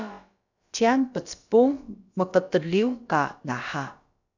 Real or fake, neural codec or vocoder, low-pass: fake; codec, 16 kHz, about 1 kbps, DyCAST, with the encoder's durations; 7.2 kHz